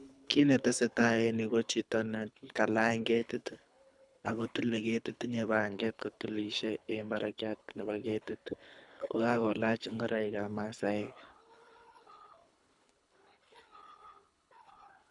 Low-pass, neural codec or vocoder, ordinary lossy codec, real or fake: 10.8 kHz; codec, 24 kHz, 3 kbps, HILCodec; none; fake